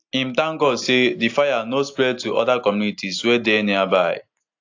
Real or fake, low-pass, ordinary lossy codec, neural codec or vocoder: real; 7.2 kHz; AAC, 48 kbps; none